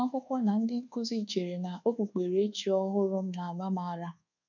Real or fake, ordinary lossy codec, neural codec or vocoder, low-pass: fake; none; codec, 24 kHz, 1.2 kbps, DualCodec; 7.2 kHz